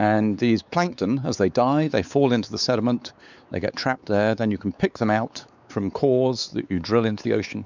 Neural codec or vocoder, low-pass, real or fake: codec, 16 kHz, 4 kbps, X-Codec, WavLM features, trained on Multilingual LibriSpeech; 7.2 kHz; fake